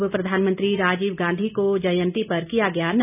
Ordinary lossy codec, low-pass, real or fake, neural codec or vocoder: none; 3.6 kHz; real; none